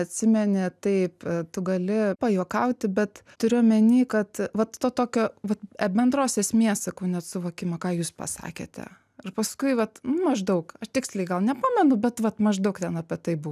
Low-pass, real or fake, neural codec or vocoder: 14.4 kHz; real; none